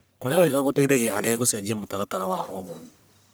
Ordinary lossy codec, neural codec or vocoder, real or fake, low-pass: none; codec, 44.1 kHz, 1.7 kbps, Pupu-Codec; fake; none